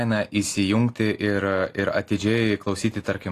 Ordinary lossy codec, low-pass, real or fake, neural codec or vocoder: AAC, 48 kbps; 14.4 kHz; real; none